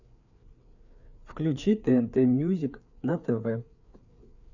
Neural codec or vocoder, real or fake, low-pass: codec, 16 kHz, 4 kbps, FreqCodec, larger model; fake; 7.2 kHz